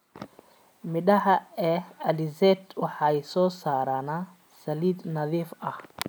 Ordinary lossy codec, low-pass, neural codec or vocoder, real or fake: none; none; none; real